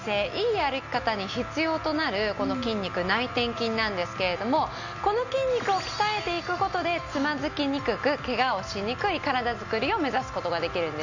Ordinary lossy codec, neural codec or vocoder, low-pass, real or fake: none; none; 7.2 kHz; real